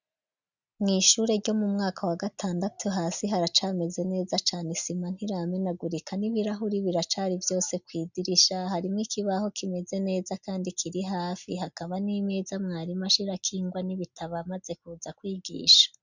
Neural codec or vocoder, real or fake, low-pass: none; real; 7.2 kHz